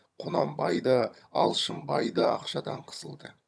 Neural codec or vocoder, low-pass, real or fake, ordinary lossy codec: vocoder, 22.05 kHz, 80 mel bands, HiFi-GAN; none; fake; none